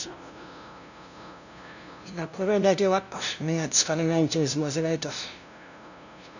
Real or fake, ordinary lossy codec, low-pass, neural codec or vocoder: fake; none; 7.2 kHz; codec, 16 kHz, 0.5 kbps, FunCodec, trained on LibriTTS, 25 frames a second